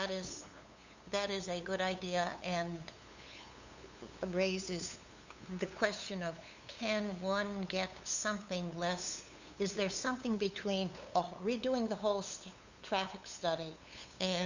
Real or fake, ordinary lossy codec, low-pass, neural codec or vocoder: fake; Opus, 64 kbps; 7.2 kHz; codec, 16 kHz, 8 kbps, FunCodec, trained on LibriTTS, 25 frames a second